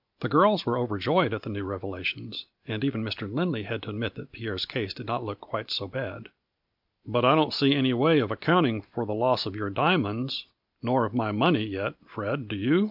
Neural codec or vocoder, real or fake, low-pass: none; real; 5.4 kHz